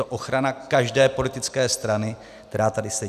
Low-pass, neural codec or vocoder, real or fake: 14.4 kHz; none; real